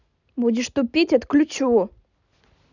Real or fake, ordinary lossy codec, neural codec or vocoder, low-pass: real; none; none; 7.2 kHz